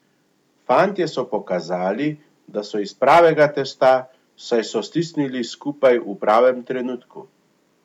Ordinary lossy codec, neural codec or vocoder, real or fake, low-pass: none; none; real; 19.8 kHz